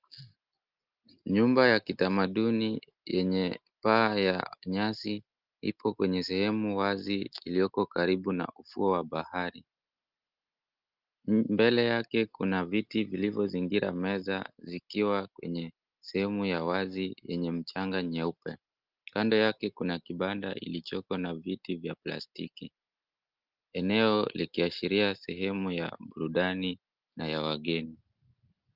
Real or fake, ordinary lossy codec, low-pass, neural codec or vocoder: real; Opus, 24 kbps; 5.4 kHz; none